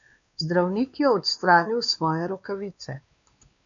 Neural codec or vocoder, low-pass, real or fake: codec, 16 kHz, 2 kbps, X-Codec, WavLM features, trained on Multilingual LibriSpeech; 7.2 kHz; fake